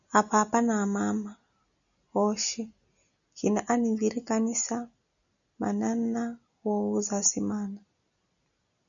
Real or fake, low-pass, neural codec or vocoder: real; 7.2 kHz; none